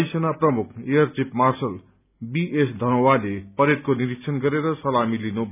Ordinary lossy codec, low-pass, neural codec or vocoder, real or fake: none; 3.6 kHz; none; real